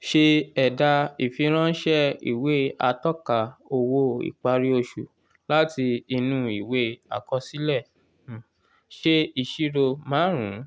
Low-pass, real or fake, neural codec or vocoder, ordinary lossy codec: none; real; none; none